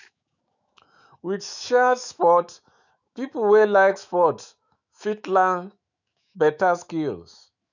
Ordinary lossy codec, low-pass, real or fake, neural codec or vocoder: none; 7.2 kHz; fake; autoencoder, 48 kHz, 128 numbers a frame, DAC-VAE, trained on Japanese speech